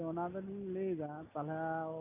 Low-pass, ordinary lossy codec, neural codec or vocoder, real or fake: 3.6 kHz; none; none; real